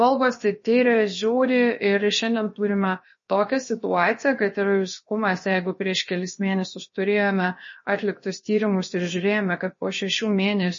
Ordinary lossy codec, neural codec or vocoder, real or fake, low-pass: MP3, 32 kbps; codec, 16 kHz, about 1 kbps, DyCAST, with the encoder's durations; fake; 7.2 kHz